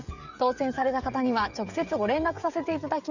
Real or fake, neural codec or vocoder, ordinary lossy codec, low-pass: fake; codec, 16 kHz, 16 kbps, FreqCodec, smaller model; none; 7.2 kHz